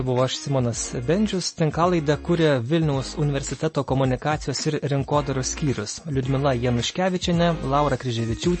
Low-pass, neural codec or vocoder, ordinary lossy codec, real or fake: 10.8 kHz; none; MP3, 32 kbps; real